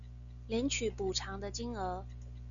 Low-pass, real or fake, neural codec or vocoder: 7.2 kHz; real; none